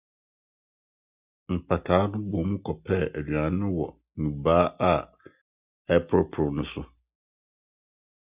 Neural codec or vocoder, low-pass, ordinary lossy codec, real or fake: none; 3.6 kHz; Opus, 64 kbps; real